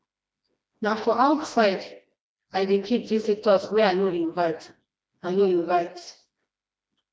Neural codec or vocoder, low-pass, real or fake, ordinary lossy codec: codec, 16 kHz, 1 kbps, FreqCodec, smaller model; none; fake; none